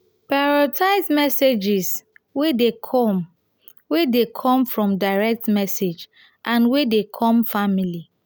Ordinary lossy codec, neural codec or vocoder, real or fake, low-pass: none; none; real; none